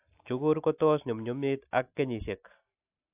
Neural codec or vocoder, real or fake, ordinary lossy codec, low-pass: none; real; AAC, 24 kbps; 3.6 kHz